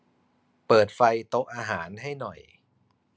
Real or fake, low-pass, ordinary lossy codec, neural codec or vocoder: real; none; none; none